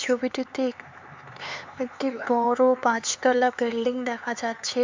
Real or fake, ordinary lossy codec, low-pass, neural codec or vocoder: fake; MP3, 64 kbps; 7.2 kHz; codec, 16 kHz, 4 kbps, X-Codec, HuBERT features, trained on LibriSpeech